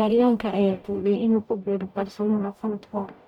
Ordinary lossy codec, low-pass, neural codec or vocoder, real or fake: none; 19.8 kHz; codec, 44.1 kHz, 0.9 kbps, DAC; fake